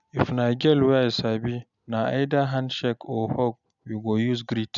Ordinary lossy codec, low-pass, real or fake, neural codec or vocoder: none; 7.2 kHz; real; none